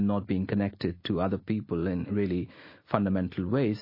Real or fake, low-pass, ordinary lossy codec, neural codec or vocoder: real; 5.4 kHz; MP3, 24 kbps; none